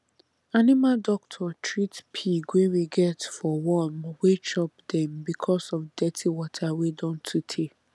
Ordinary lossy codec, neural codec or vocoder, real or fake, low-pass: none; none; real; none